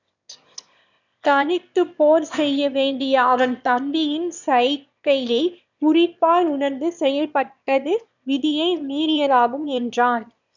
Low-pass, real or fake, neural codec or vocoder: 7.2 kHz; fake; autoencoder, 22.05 kHz, a latent of 192 numbers a frame, VITS, trained on one speaker